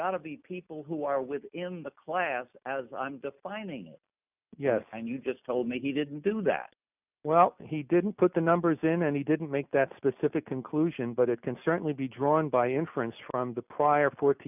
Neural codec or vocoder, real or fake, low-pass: none; real; 3.6 kHz